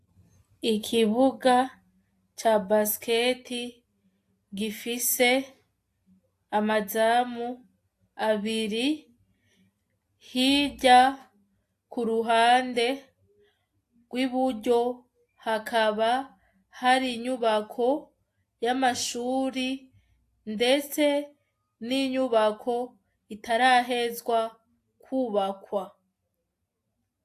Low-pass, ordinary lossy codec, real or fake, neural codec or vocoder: 14.4 kHz; AAC, 64 kbps; real; none